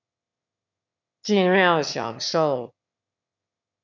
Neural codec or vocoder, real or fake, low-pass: autoencoder, 22.05 kHz, a latent of 192 numbers a frame, VITS, trained on one speaker; fake; 7.2 kHz